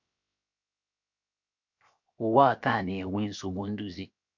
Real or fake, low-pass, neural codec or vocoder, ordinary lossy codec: fake; 7.2 kHz; codec, 16 kHz, 0.7 kbps, FocalCodec; MP3, 48 kbps